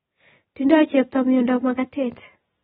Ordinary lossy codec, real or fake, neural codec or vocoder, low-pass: AAC, 16 kbps; real; none; 7.2 kHz